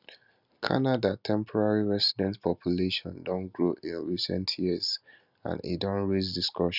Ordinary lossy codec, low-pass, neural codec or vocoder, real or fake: none; 5.4 kHz; none; real